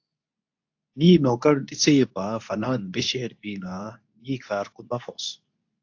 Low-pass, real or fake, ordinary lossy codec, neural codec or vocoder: 7.2 kHz; fake; AAC, 48 kbps; codec, 24 kHz, 0.9 kbps, WavTokenizer, medium speech release version 2